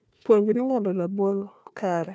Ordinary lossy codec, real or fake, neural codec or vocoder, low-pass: none; fake; codec, 16 kHz, 1 kbps, FunCodec, trained on Chinese and English, 50 frames a second; none